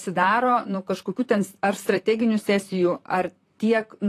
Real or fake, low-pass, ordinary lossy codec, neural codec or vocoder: fake; 14.4 kHz; AAC, 48 kbps; vocoder, 44.1 kHz, 128 mel bands, Pupu-Vocoder